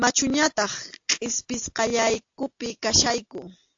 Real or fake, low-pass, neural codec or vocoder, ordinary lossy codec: real; 7.2 kHz; none; AAC, 48 kbps